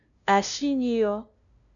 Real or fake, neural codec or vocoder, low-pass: fake; codec, 16 kHz, 0.5 kbps, FunCodec, trained on LibriTTS, 25 frames a second; 7.2 kHz